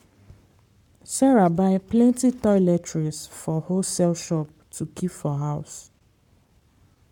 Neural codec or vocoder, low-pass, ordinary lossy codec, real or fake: codec, 44.1 kHz, 7.8 kbps, Pupu-Codec; 19.8 kHz; MP3, 96 kbps; fake